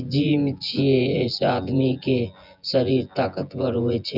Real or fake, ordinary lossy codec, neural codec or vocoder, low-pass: fake; none; vocoder, 24 kHz, 100 mel bands, Vocos; 5.4 kHz